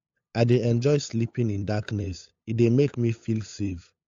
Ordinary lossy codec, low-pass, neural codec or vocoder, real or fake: AAC, 48 kbps; 7.2 kHz; codec, 16 kHz, 8 kbps, FunCodec, trained on LibriTTS, 25 frames a second; fake